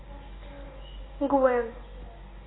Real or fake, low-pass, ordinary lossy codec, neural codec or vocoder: real; 7.2 kHz; AAC, 16 kbps; none